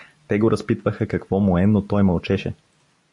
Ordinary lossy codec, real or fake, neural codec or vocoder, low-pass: AAC, 64 kbps; real; none; 10.8 kHz